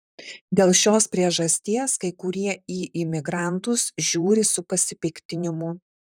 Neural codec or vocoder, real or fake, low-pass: vocoder, 44.1 kHz, 128 mel bands, Pupu-Vocoder; fake; 19.8 kHz